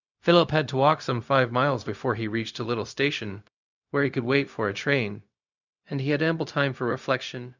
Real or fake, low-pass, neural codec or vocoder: fake; 7.2 kHz; codec, 16 kHz, 0.4 kbps, LongCat-Audio-Codec